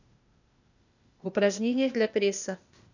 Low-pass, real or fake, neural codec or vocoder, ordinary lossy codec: 7.2 kHz; fake; codec, 16 kHz, 0.8 kbps, ZipCodec; none